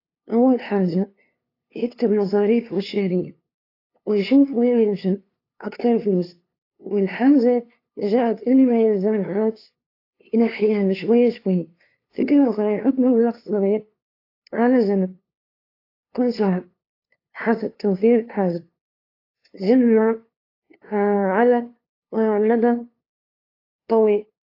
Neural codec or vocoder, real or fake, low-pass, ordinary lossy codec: codec, 16 kHz, 2 kbps, FunCodec, trained on LibriTTS, 25 frames a second; fake; 5.4 kHz; AAC, 32 kbps